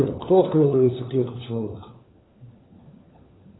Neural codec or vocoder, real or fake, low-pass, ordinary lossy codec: codec, 16 kHz, 4 kbps, FunCodec, trained on LibriTTS, 50 frames a second; fake; 7.2 kHz; AAC, 16 kbps